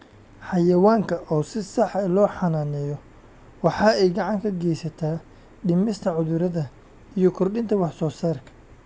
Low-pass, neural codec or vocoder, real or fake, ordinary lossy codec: none; none; real; none